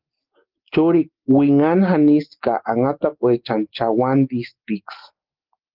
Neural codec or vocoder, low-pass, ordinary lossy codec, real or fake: none; 5.4 kHz; Opus, 16 kbps; real